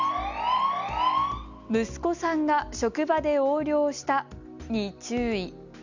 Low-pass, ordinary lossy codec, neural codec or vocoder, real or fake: 7.2 kHz; Opus, 64 kbps; none; real